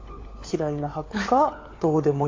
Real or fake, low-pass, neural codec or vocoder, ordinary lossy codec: fake; 7.2 kHz; codec, 16 kHz, 4 kbps, FunCodec, trained on LibriTTS, 50 frames a second; AAC, 32 kbps